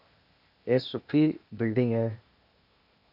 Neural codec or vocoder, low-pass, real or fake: codec, 16 kHz, 0.8 kbps, ZipCodec; 5.4 kHz; fake